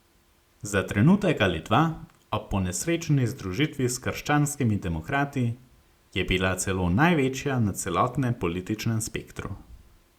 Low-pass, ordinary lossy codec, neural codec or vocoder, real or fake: 19.8 kHz; Opus, 64 kbps; none; real